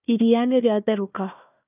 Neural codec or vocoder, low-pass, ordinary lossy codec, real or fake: codec, 16 kHz, 1 kbps, FunCodec, trained on Chinese and English, 50 frames a second; 3.6 kHz; none; fake